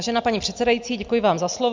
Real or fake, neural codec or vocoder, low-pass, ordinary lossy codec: real; none; 7.2 kHz; MP3, 64 kbps